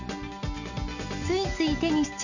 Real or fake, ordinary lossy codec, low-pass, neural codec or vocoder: real; none; 7.2 kHz; none